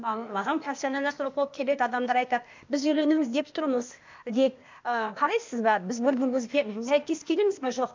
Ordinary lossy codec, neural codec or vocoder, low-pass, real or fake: MP3, 64 kbps; codec, 16 kHz, 0.8 kbps, ZipCodec; 7.2 kHz; fake